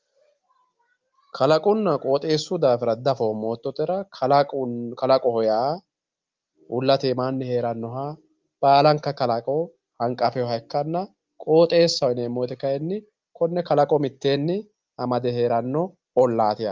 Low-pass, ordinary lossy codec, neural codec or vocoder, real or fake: 7.2 kHz; Opus, 32 kbps; none; real